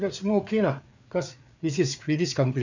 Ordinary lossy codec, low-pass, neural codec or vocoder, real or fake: none; 7.2 kHz; codec, 16 kHz, 4 kbps, FreqCodec, larger model; fake